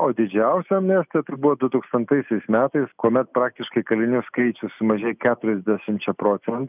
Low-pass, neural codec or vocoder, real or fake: 3.6 kHz; none; real